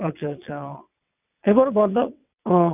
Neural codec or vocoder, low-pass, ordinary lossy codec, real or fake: vocoder, 22.05 kHz, 80 mel bands, WaveNeXt; 3.6 kHz; none; fake